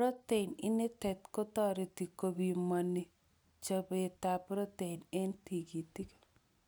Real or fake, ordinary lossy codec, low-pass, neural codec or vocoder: real; none; none; none